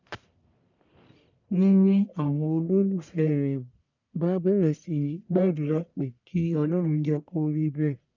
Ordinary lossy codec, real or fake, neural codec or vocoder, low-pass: none; fake; codec, 44.1 kHz, 1.7 kbps, Pupu-Codec; 7.2 kHz